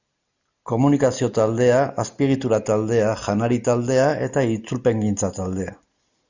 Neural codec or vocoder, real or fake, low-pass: none; real; 7.2 kHz